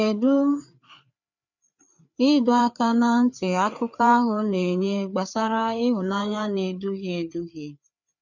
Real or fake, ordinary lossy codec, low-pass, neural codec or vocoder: fake; none; 7.2 kHz; codec, 16 kHz, 4 kbps, FreqCodec, larger model